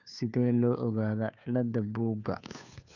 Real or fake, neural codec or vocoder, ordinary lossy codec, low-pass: fake; codec, 16 kHz, 2 kbps, FunCodec, trained on Chinese and English, 25 frames a second; none; 7.2 kHz